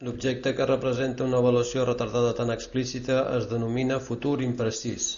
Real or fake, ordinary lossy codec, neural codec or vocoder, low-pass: real; Opus, 64 kbps; none; 7.2 kHz